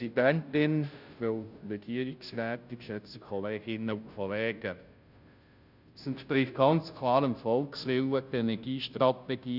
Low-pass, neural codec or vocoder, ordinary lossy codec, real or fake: 5.4 kHz; codec, 16 kHz, 0.5 kbps, FunCodec, trained on Chinese and English, 25 frames a second; none; fake